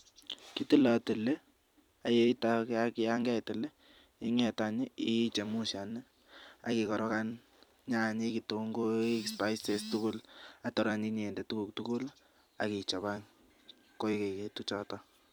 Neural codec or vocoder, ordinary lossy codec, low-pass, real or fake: vocoder, 44.1 kHz, 128 mel bands every 256 samples, BigVGAN v2; none; none; fake